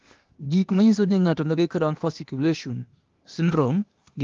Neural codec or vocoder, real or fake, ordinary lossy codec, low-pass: codec, 16 kHz, 0.8 kbps, ZipCodec; fake; Opus, 32 kbps; 7.2 kHz